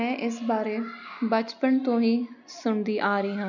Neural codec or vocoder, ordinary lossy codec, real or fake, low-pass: none; none; real; 7.2 kHz